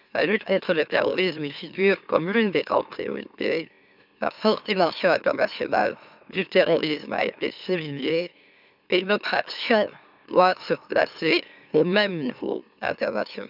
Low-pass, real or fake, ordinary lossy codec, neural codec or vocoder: 5.4 kHz; fake; none; autoencoder, 44.1 kHz, a latent of 192 numbers a frame, MeloTTS